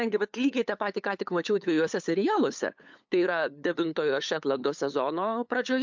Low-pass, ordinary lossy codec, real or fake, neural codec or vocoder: 7.2 kHz; MP3, 64 kbps; fake; codec, 16 kHz, 8 kbps, FunCodec, trained on LibriTTS, 25 frames a second